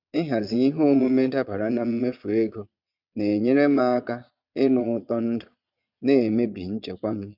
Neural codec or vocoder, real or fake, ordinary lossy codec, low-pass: vocoder, 22.05 kHz, 80 mel bands, Vocos; fake; none; 5.4 kHz